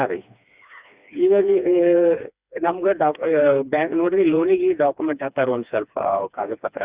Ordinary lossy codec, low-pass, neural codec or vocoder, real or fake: Opus, 64 kbps; 3.6 kHz; codec, 16 kHz, 2 kbps, FreqCodec, smaller model; fake